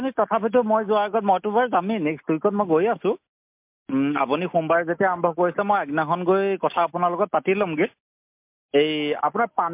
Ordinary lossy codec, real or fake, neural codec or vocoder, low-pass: MP3, 32 kbps; real; none; 3.6 kHz